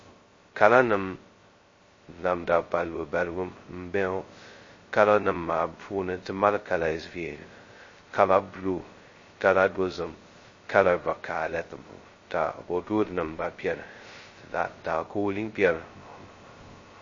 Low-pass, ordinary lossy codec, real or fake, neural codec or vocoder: 7.2 kHz; MP3, 32 kbps; fake; codec, 16 kHz, 0.2 kbps, FocalCodec